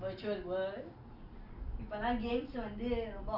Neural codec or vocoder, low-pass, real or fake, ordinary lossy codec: none; 5.4 kHz; real; none